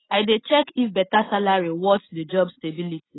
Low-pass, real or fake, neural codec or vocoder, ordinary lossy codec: 7.2 kHz; real; none; AAC, 16 kbps